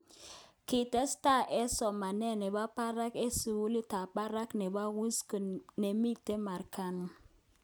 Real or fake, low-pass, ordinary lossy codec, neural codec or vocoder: real; none; none; none